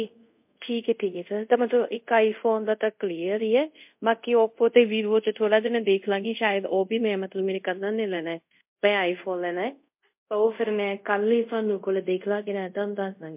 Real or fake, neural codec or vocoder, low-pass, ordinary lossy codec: fake; codec, 24 kHz, 0.5 kbps, DualCodec; 3.6 kHz; MP3, 32 kbps